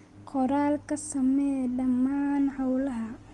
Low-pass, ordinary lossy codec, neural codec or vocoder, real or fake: 10.8 kHz; Opus, 24 kbps; none; real